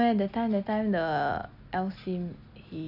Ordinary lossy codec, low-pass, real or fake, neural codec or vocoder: none; 5.4 kHz; real; none